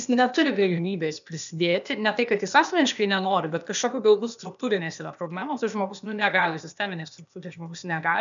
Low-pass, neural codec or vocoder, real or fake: 7.2 kHz; codec, 16 kHz, 0.8 kbps, ZipCodec; fake